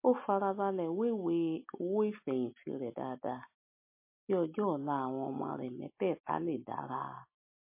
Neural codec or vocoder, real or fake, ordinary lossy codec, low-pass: none; real; MP3, 24 kbps; 3.6 kHz